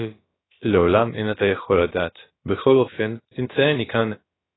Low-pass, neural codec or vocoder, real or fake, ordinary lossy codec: 7.2 kHz; codec, 16 kHz, about 1 kbps, DyCAST, with the encoder's durations; fake; AAC, 16 kbps